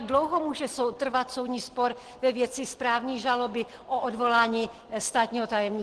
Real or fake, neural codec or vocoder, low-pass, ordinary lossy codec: real; none; 10.8 kHz; Opus, 16 kbps